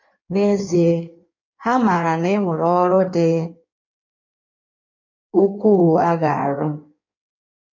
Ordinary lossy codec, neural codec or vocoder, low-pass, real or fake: MP3, 48 kbps; codec, 16 kHz in and 24 kHz out, 1.1 kbps, FireRedTTS-2 codec; 7.2 kHz; fake